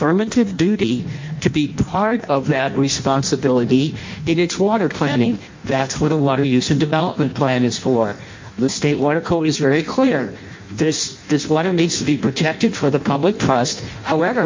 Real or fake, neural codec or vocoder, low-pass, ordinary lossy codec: fake; codec, 16 kHz in and 24 kHz out, 0.6 kbps, FireRedTTS-2 codec; 7.2 kHz; MP3, 48 kbps